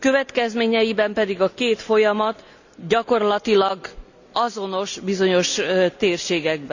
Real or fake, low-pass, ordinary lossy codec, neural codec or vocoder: real; 7.2 kHz; none; none